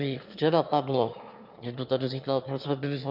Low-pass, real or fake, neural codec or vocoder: 5.4 kHz; fake; autoencoder, 22.05 kHz, a latent of 192 numbers a frame, VITS, trained on one speaker